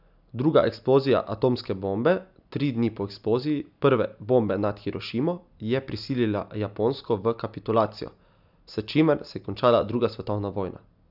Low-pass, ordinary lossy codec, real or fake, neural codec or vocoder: 5.4 kHz; none; real; none